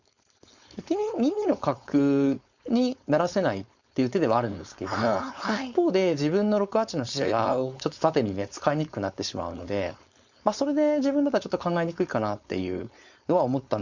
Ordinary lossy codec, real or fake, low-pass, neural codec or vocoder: Opus, 64 kbps; fake; 7.2 kHz; codec, 16 kHz, 4.8 kbps, FACodec